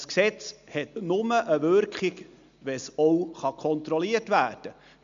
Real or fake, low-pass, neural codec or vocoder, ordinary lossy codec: real; 7.2 kHz; none; MP3, 64 kbps